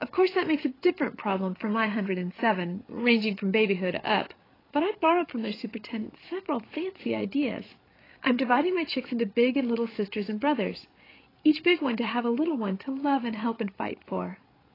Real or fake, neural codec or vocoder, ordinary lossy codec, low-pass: fake; codec, 16 kHz, 8 kbps, FreqCodec, larger model; AAC, 24 kbps; 5.4 kHz